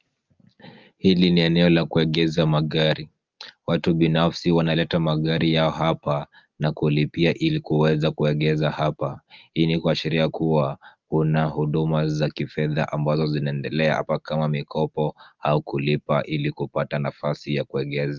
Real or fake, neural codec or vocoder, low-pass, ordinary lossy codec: real; none; 7.2 kHz; Opus, 24 kbps